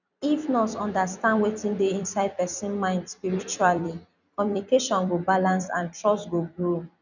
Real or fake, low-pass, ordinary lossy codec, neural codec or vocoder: fake; 7.2 kHz; none; vocoder, 44.1 kHz, 128 mel bands every 256 samples, BigVGAN v2